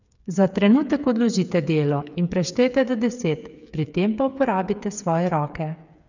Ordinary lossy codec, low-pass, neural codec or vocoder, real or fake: none; 7.2 kHz; codec, 16 kHz, 8 kbps, FreqCodec, smaller model; fake